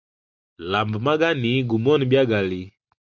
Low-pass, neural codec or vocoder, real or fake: 7.2 kHz; none; real